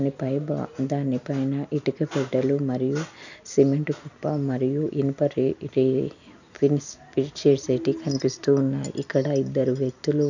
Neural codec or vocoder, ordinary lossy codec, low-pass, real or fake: none; none; 7.2 kHz; real